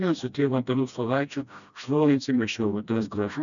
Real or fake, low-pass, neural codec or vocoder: fake; 7.2 kHz; codec, 16 kHz, 1 kbps, FreqCodec, smaller model